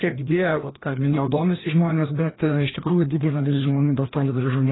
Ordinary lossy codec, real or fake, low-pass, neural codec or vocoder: AAC, 16 kbps; fake; 7.2 kHz; codec, 16 kHz, 1 kbps, FreqCodec, larger model